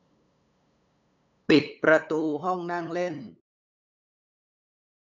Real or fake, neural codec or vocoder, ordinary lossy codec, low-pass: fake; codec, 16 kHz, 8 kbps, FunCodec, trained on LibriTTS, 25 frames a second; none; 7.2 kHz